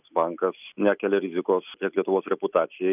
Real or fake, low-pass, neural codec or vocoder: real; 3.6 kHz; none